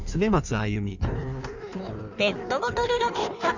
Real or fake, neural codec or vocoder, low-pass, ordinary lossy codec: fake; codec, 16 kHz in and 24 kHz out, 1.1 kbps, FireRedTTS-2 codec; 7.2 kHz; none